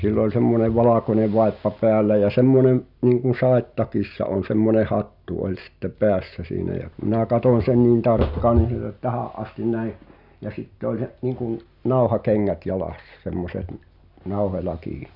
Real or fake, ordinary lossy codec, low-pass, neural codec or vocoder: real; none; 5.4 kHz; none